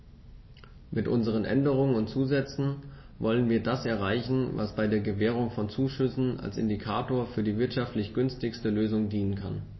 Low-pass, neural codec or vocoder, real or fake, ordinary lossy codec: 7.2 kHz; none; real; MP3, 24 kbps